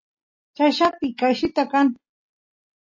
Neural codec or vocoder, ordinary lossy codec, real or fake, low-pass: none; MP3, 32 kbps; real; 7.2 kHz